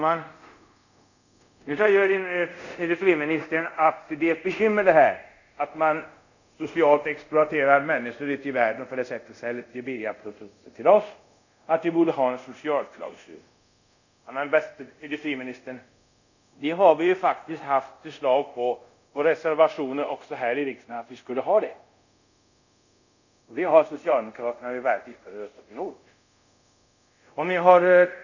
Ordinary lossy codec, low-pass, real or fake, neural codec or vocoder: none; 7.2 kHz; fake; codec, 24 kHz, 0.5 kbps, DualCodec